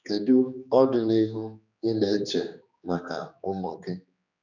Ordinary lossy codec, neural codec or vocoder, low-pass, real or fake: none; codec, 16 kHz, 2 kbps, X-Codec, HuBERT features, trained on general audio; 7.2 kHz; fake